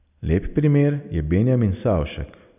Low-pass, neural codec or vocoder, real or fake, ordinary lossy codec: 3.6 kHz; none; real; none